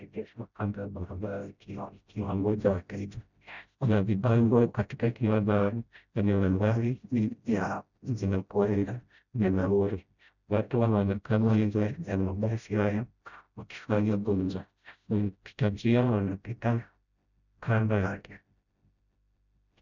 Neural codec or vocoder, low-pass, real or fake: codec, 16 kHz, 0.5 kbps, FreqCodec, smaller model; 7.2 kHz; fake